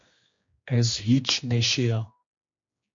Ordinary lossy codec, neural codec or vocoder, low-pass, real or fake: MP3, 48 kbps; codec, 16 kHz, 2 kbps, X-Codec, HuBERT features, trained on general audio; 7.2 kHz; fake